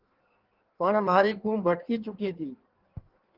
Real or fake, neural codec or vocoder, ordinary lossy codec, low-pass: fake; codec, 16 kHz in and 24 kHz out, 1.1 kbps, FireRedTTS-2 codec; Opus, 16 kbps; 5.4 kHz